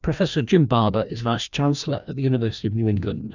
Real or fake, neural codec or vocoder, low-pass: fake; codec, 16 kHz, 1 kbps, FreqCodec, larger model; 7.2 kHz